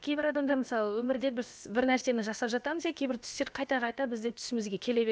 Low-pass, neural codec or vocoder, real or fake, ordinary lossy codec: none; codec, 16 kHz, about 1 kbps, DyCAST, with the encoder's durations; fake; none